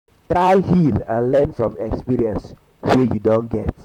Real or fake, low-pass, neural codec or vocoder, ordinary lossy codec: fake; 19.8 kHz; vocoder, 44.1 kHz, 128 mel bands, Pupu-Vocoder; none